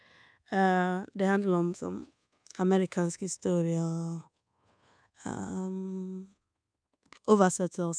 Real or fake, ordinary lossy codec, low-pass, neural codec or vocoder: fake; none; 9.9 kHz; codec, 24 kHz, 1.2 kbps, DualCodec